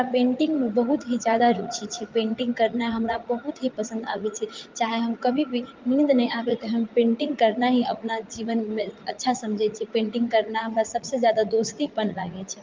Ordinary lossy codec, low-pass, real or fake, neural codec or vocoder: Opus, 24 kbps; 7.2 kHz; fake; vocoder, 44.1 kHz, 80 mel bands, Vocos